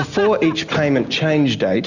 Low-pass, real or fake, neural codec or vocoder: 7.2 kHz; real; none